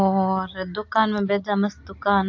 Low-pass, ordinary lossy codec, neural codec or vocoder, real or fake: 7.2 kHz; AAC, 48 kbps; none; real